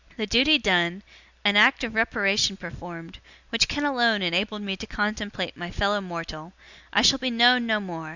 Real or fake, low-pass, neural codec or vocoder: real; 7.2 kHz; none